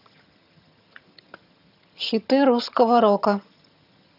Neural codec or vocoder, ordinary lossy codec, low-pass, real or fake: vocoder, 22.05 kHz, 80 mel bands, HiFi-GAN; none; 5.4 kHz; fake